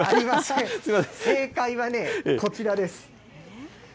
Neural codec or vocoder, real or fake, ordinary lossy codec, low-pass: none; real; none; none